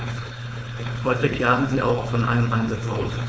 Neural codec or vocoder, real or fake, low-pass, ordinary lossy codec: codec, 16 kHz, 4.8 kbps, FACodec; fake; none; none